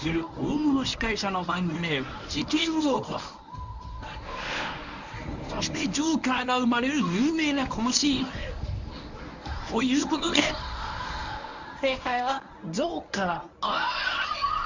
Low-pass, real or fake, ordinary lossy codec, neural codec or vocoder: 7.2 kHz; fake; Opus, 64 kbps; codec, 24 kHz, 0.9 kbps, WavTokenizer, medium speech release version 1